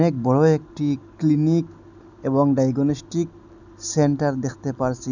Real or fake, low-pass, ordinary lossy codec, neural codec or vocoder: real; 7.2 kHz; none; none